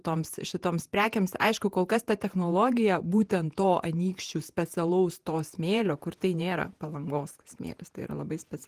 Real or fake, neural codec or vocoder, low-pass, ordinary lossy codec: fake; vocoder, 48 kHz, 128 mel bands, Vocos; 14.4 kHz; Opus, 24 kbps